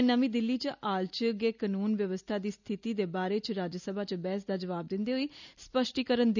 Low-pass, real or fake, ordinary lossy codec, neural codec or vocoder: 7.2 kHz; real; none; none